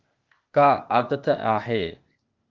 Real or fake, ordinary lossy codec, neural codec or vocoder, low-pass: fake; Opus, 24 kbps; codec, 16 kHz, 0.8 kbps, ZipCodec; 7.2 kHz